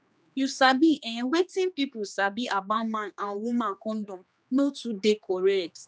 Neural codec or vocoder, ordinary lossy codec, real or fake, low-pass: codec, 16 kHz, 2 kbps, X-Codec, HuBERT features, trained on general audio; none; fake; none